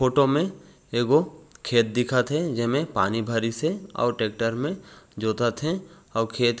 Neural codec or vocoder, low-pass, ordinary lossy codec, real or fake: none; none; none; real